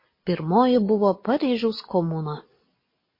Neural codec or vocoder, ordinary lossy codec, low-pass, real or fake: none; MP3, 24 kbps; 5.4 kHz; real